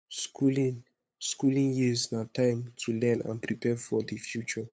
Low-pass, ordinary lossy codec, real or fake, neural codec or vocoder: none; none; fake; codec, 16 kHz, 8 kbps, FunCodec, trained on LibriTTS, 25 frames a second